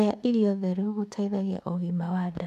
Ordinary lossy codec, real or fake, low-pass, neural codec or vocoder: none; fake; 14.4 kHz; autoencoder, 48 kHz, 32 numbers a frame, DAC-VAE, trained on Japanese speech